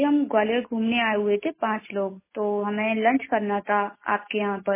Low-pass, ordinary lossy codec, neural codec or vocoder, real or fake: 3.6 kHz; MP3, 16 kbps; none; real